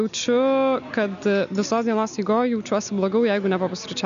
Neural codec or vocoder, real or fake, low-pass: none; real; 7.2 kHz